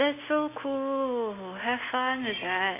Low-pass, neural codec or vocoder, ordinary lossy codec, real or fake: 3.6 kHz; none; AAC, 24 kbps; real